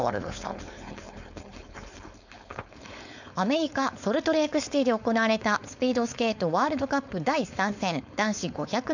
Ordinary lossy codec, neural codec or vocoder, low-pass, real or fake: none; codec, 16 kHz, 4.8 kbps, FACodec; 7.2 kHz; fake